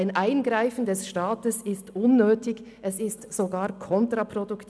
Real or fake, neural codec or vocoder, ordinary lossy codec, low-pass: real; none; none; none